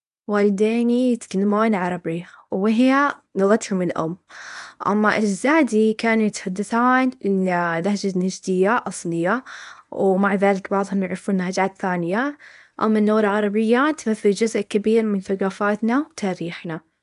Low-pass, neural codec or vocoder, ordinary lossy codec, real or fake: 10.8 kHz; codec, 24 kHz, 0.9 kbps, WavTokenizer, medium speech release version 1; none; fake